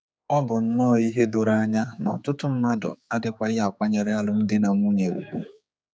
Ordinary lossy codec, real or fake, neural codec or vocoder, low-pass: none; fake; codec, 16 kHz, 4 kbps, X-Codec, HuBERT features, trained on general audio; none